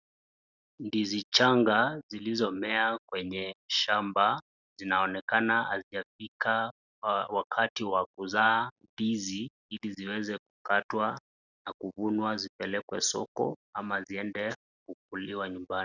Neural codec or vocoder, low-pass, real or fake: none; 7.2 kHz; real